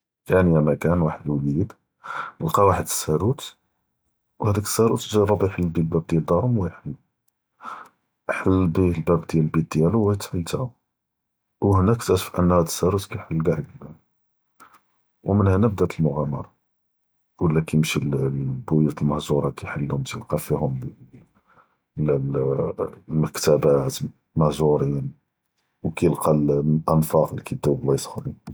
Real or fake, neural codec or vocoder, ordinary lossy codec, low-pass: real; none; none; none